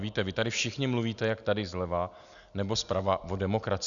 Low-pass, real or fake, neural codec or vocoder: 7.2 kHz; real; none